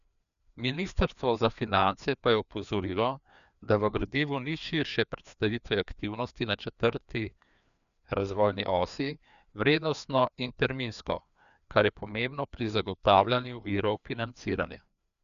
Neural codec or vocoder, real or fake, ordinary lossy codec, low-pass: codec, 16 kHz, 2 kbps, FreqCodec, larger model; fake; none; 7.2 kHz